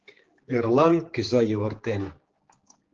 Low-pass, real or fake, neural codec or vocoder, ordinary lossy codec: 7.2 kHz; fake; codec, 16 kHz, 4 kbps, X-Codec, HuBERT features, trained on general audio; Opus, 16 kbps